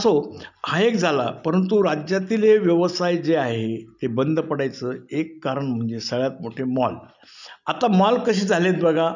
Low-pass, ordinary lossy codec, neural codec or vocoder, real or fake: 7.2 kHz; none; none; real